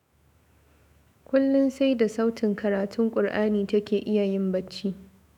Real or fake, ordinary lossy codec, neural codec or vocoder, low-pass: fake; none; autoencoder, 48 kHz, 128 numbers a frame, DAC-VAE, trained on Japanese speech; 19.8 kHz